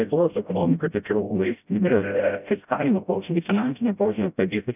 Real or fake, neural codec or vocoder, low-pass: fake; codec, 16 kHz, 0.5 kbps, FreqCodec, smaller model; 3.6 kHz